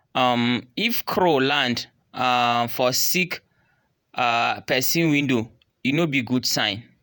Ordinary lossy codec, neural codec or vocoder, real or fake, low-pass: none; vocoder, 48 kHz, 128 mel bands, Vocos; fake; none